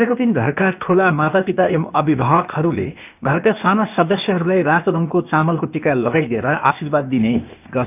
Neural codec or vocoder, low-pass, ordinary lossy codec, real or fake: codec, 16 kHz, 0.8 kbps, ZipCodec; 3.6 kHz; none; fake